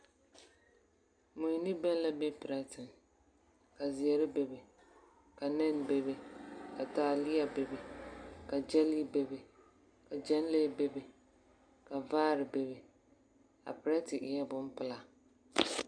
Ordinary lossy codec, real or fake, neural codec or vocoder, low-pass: MP3, 96 kbps; real; none; 9.9 kHz